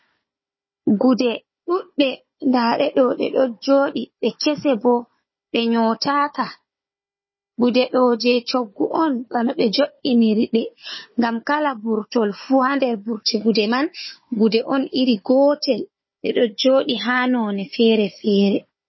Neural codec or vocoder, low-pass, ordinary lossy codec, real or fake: codec, 16 kHz, 4 kbps, FunCodec, trained on Chinese and English, 50 frames a second; 7.2 kHz; MP3, 24 kbps; fake